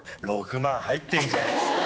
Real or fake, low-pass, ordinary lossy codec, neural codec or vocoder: fake; none; none; codec, 16 kHz, 2 kbps, X-Codec, HuBERT features, trained on general audio